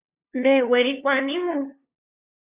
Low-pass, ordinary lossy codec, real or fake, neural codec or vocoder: 3.6 kHz; Opus, 64 kbps; fake; codec, 16 kHz, 2 kbps, FunCodec, trained on LibriTTS, 25 frames a second